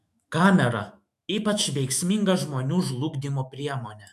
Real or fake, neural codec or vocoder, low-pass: fake; autoencoder, 48 kHz, 128 numbers a frame, DAC-VAE, trained on Japanese speech; 14.4 kHz